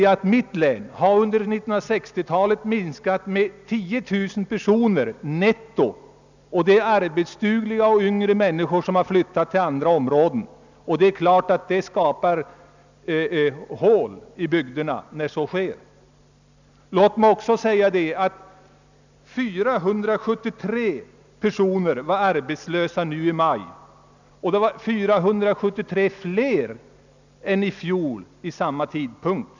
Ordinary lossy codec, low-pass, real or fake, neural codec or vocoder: none; 7.2 kHz; real; none